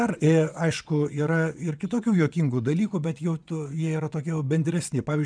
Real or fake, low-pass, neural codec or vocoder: fake; 9.9 kHz; vocoder, 22.05 kHz, 80 mel bands, WaveNeXt